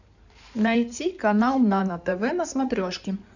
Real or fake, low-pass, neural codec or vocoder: fake; 7.2 kHz; codec, 16 kHz in and 24 kHz out, 2.2 kbps, FireRedTTS-2 codec